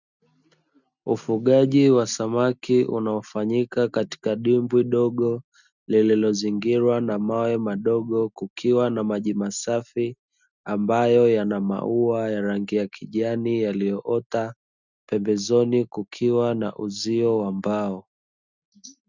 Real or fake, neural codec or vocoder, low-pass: real; none; 7.2 kHz